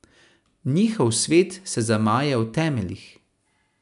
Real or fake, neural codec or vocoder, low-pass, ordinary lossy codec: real; none; 10.8 kHz; none